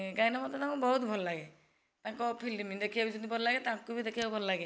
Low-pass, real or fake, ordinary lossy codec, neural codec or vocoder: none; real; none; none